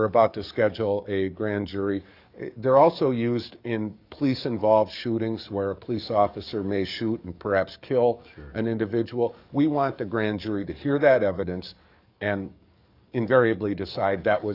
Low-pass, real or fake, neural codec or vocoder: 5.4 kHz; fake; codec, 44.1 kHz, 7.8 kbps, DAC